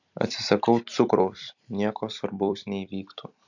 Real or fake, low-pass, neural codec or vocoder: fake; 7.2 kHz; vocoder, 44.1 kHz, 128 mel bands every 256 samples, BigVGAN v2